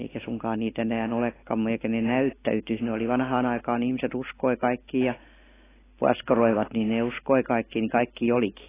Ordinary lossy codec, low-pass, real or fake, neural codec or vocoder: AAC, 16 kbps; 3.6 kHz; real; none